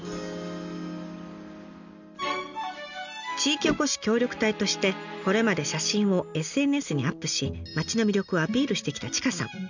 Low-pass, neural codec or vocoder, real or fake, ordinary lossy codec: 7.2 kHz; none; real; none